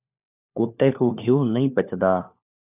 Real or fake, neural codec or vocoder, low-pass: fake; codec, 16 kHz, 4 kbps, FunCodec, trained on LibriTTS, 50 frames a second; 3.6 kHz